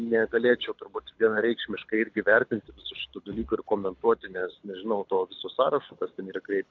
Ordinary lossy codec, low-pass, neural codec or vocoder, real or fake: AAC, 48 kbps; 7.2 kHz; codec, 24 kHz, 6 kbps, HILCodec; fake